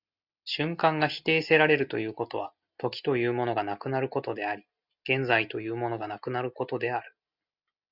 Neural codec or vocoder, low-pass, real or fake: none; 5.4 kHz; real